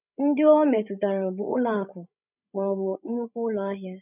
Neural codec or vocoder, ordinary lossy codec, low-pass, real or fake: codec, 16 kHz, 16 kbps, FreqCodec, larger model; none; 3.6 kHz; fake